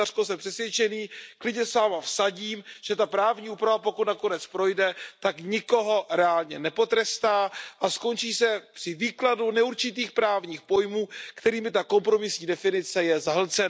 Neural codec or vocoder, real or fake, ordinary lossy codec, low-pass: none; real; none; none